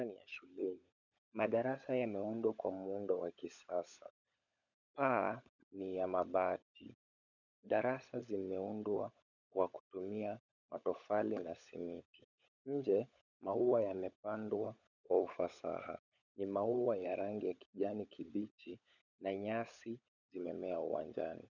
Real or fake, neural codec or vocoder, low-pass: fake; codec, 16 kHz, 16 kbps, FunCodec, trained on LibriTTS, 50 frames a second; 7.2 kHz